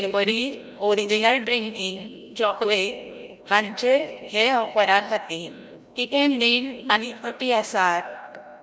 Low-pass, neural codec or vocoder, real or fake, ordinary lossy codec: none; codec, 16 kHz, 0.5 kbps, FreqCodec, larger model; fake; none